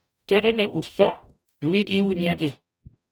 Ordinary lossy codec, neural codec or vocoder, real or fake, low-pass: none; codec, 44.1 kHz, 0.9 kbps, DAC; fake; none